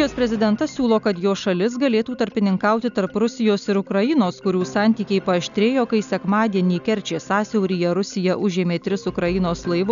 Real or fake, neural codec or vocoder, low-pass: real; none; 7.2 kHz